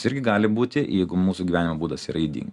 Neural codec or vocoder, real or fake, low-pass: none; real; 10.8 kHz